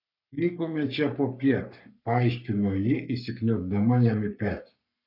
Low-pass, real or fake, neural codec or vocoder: 5.4 kHz; fake; codec, 44.1 kHz, 3.4 kbps, Pupu-Codec